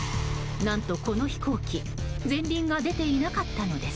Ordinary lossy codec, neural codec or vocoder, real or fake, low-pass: none; none; real; none